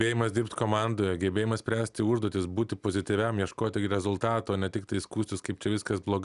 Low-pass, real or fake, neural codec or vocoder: 10.8 kHz; real; none